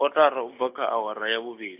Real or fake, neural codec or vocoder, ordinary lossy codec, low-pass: real; none; AAC, 32 kbps; 3.6 kHz